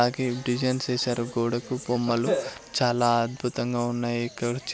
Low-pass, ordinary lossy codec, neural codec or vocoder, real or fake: none; none; none; real